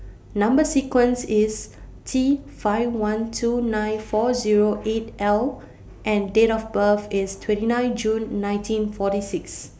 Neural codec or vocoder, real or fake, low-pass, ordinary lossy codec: none; real; none; none